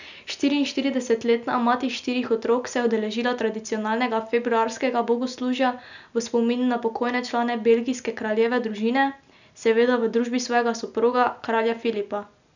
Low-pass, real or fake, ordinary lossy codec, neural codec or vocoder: 7.2 kHz; real; none; none